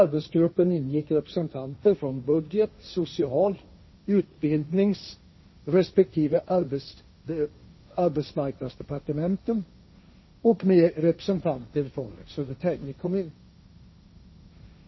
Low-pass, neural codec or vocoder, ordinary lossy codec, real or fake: 7.2 kHz; codec, 16 kHz, 1.1 kbps, Voila-Tokenizer; MP3, 24 kbps; fake